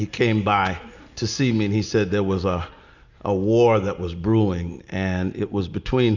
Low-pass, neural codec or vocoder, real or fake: 7.2 kHz; none; real